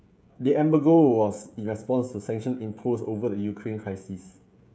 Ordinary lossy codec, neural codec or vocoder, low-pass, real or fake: none; codec, 16 kHz, 16 kbps, FreqCodec, smaller model; none; fake